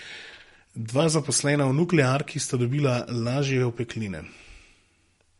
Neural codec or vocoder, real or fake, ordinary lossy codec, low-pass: none; real; MP3, 48 kbps; 19.8 kHz